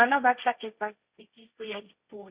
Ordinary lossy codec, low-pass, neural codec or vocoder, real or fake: Opus, 64 kbps; 3.6 kHz; codec, 16 kHz, 1.1 kbps, Voila-Tokenizer; fake